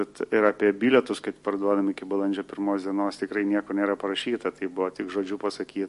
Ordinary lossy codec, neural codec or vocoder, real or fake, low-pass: MP3, 48 kbps; none; real; 14.4 kHz